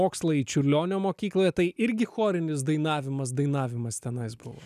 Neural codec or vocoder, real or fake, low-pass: none; real; 14.4 kHz